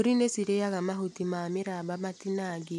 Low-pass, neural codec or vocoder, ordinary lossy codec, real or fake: 14.4 kHz; none; none; real